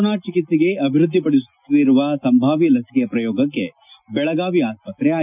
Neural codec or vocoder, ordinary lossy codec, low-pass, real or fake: none; none; 3.6 kHz; real